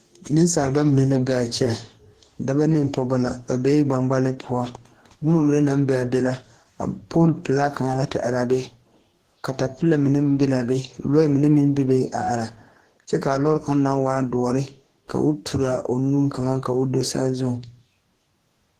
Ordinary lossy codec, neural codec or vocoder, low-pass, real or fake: Opus, 16 kbps; codec, 44.1 kHz, 2.6 kbps, DAC; 14.4 kHz; fake